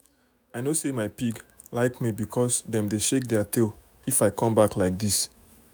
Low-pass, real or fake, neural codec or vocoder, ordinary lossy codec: none; fake; autoencoder, 48 kHz, 128 numbers a frame, DAC-VAE, trained on Japanese speech; none